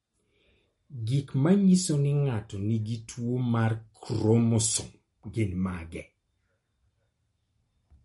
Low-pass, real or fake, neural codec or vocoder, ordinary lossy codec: 19.8 kHz; real; none; MP3, 48 kbps